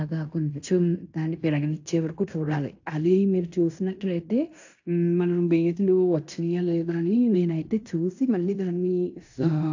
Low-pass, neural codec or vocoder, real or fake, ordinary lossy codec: 7.2 kHz; codec, 16 kHz in and 24 kHz out, 0.9 kbps, LongCat-Audio-Codec, fine tuned four codebook decoder; fake; AAC, 48 kbps